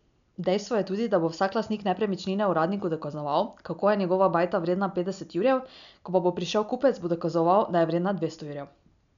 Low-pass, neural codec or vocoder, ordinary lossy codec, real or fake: 7.2 kHz; none; none; real